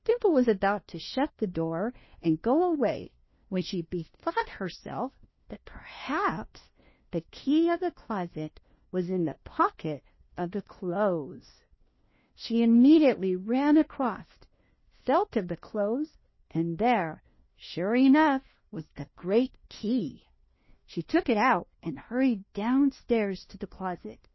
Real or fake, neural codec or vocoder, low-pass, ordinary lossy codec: fake; codec, 16 kHz, 1 kbps, FunCodec, trained on LibriTTS, 50 frames a second; 7.2 kHz; MP3, 24 kbps